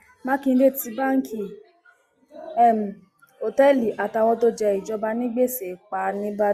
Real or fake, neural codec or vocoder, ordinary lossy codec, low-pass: real; none; Opus, 64 kbps; 14.4 kHz